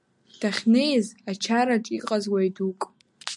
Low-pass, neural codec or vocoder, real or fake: 10.8 kHz; none; real